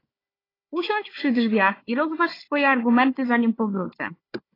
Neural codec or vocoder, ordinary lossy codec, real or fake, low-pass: codec, 16 kHz, 4 kbps, FunCodec, trained on Chinese and English, 50 frames a second; AAC, 24 kbps; fake; 5.4 kHz